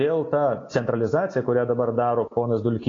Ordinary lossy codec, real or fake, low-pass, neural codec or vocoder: AAC, 32 kbps; real; 7.2 kHz; none